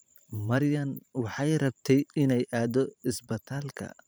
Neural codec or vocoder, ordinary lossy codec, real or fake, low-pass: vocoder, 44.1 kHz, 128 mel bands every 512 samples, BigVGAN v2; none; fake; none